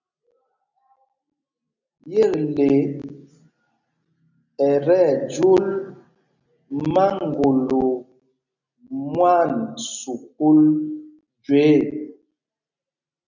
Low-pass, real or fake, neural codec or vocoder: 7.2 kHz; real; none